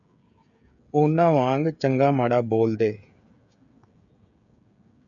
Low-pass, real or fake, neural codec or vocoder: 7.2 kHz; fake; codec, 16 kHz, 16 kbps, FreqCodec, smaller model